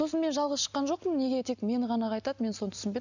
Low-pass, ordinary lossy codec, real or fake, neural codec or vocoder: 7.2 kHz; none; real; none